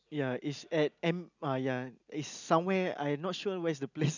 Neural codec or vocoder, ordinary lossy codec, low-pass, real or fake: none; none; 7.2 kHz; real